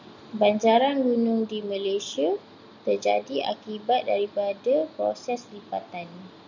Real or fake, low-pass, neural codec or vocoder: real; 7.2 kHz; none